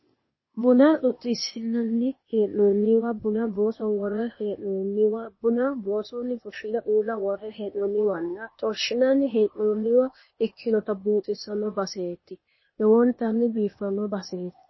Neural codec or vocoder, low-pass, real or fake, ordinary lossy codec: codec, 16 kHz, 0.8 kbps, ZipCodec; 7.2 kHz; fake; MP3, 24 kbps